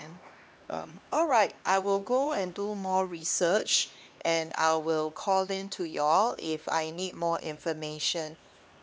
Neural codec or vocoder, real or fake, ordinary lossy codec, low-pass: codec, 16 kHz, 2 kbps, X-Codec, HuBERT features, trained on LibriSpeech; fake; none; none